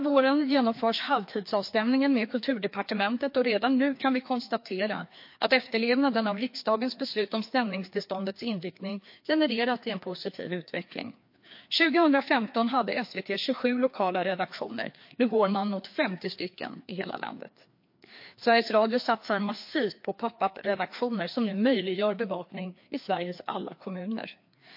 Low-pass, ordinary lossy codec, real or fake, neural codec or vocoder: 5.4 kHz; MP3, 32 kbps; fake; codec, 16 kHz, 2 kbps, FreqCodec, larger model